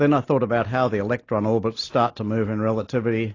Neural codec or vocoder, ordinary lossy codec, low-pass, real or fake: none; AAC, 32 kbps; 7.2 kHz; real